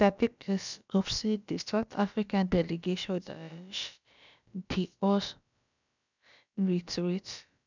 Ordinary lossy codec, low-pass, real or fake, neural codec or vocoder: none; 7.2 kHz; fake; codec, 16 kHz, about 1 kbps, DyCAST, with the encoder's durations